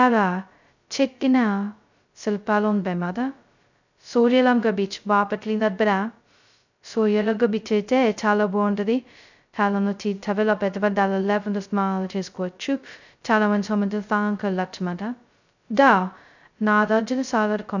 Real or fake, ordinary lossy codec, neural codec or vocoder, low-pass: fake; none; codec, 16 kHz, 0.2 kbps, FocalCodec; 7.2 kHz